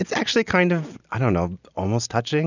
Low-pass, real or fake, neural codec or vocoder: 7.2 kHz; real; none